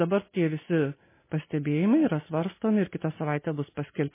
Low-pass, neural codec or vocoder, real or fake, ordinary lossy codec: 3.6 kHz; none; real; MP3, 16 kbps